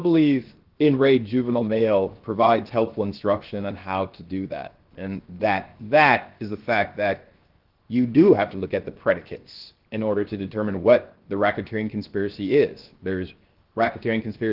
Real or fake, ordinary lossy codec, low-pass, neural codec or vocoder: fake; Opus, 16 kbps; 5.4 kHz; codec, 16 kHz, 0.7 kbps, FocalCodec